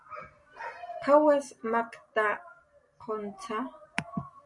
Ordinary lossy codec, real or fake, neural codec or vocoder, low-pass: Opus, 64 kbps; real; none; 10.8 kHz